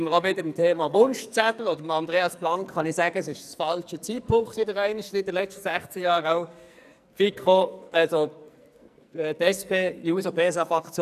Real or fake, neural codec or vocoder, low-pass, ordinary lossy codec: fake; codec, 44.1 kHz, 2.6 kbps, SNAC; 14.4 kHz; none